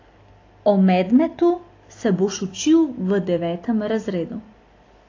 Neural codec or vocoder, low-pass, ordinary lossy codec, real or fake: none; 7.2 kHz; AAC, 32 kbps; real